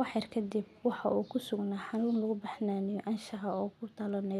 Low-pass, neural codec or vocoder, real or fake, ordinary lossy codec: 14.4 kHz; none; real; none